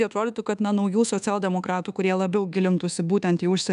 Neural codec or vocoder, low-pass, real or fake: codec, 24 kHz, 1.2 kbps, DualCodec; 10.8 kHz; fake